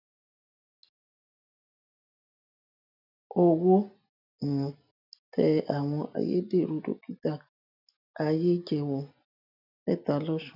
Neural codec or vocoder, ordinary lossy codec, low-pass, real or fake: none; none; 5.4 kHz; real